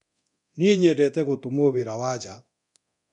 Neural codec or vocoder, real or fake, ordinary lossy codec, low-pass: codec, 24 kHz, 0.9 kbps, DualCodec; fake; none; 10.8 kHz